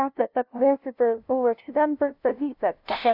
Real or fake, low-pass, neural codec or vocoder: fake; 5.4 kHz; codec, 16 kHz, 0.5 kbps, FunCodec, trained on LibriTTS, 25 frames a second